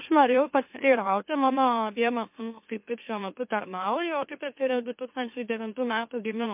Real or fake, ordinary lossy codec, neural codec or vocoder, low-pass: fake; MP3, 32 kbps; autoencoder, 44.1 kHz, a latent of 192 numbers a frame, MeloTTS; 3.6 kHz